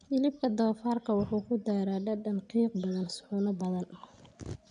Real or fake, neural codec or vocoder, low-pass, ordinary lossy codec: real; none; 9.9 kHz; none